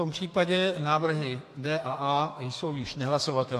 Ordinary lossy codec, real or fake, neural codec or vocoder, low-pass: AAC, 64 kbps; fake; codec, 32 kHz, 1.9 kbps, SNAC; 14.4 kHz